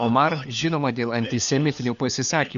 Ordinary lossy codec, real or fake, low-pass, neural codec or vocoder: MP3, 96 kbps; fake; 7.2 kHz; codec, 16 kHz, 4 kbps, FunCodec, trained on LibriTTS, 50 frames a second